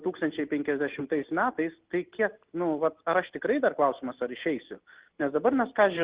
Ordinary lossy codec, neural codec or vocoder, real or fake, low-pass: Opus, 16 kbps; none; real; 3.6 kHz